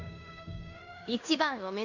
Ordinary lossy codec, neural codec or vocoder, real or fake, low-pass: none; codec, 16 kHz in and 24 kHz out, 0.9 kbps, LongCat-Audio-Codec, fine tuned four codebook decoder; fake; 7.2 kHz